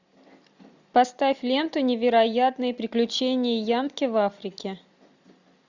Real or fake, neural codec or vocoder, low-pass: real; none; 7.2 kHz